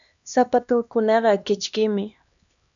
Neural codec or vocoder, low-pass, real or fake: codec, 16 kHz, 2 kbps, X-Codec, HuBERT features, trained on LibriSpeech; 7.2 kHz; fake